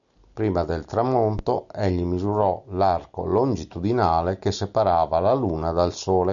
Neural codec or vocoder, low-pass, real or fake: none; 7.2 kHz; real